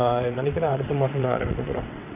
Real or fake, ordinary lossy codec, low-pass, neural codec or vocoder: fake; none; 3.6 kHz; vocoder, 22.05 kHz, 80 mel bands, WaveNeXt